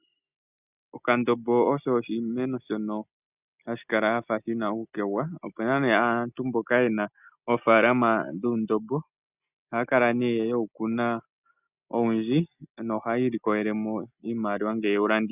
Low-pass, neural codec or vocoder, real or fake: 3.6 kHz; none; real